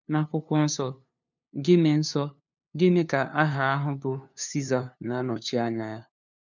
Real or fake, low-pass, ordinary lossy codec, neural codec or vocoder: fake; 7.2 kHz; none; codec, 16 kHz, 2 kbps, FunCodec, trained on LibriTTS, 25 frames a second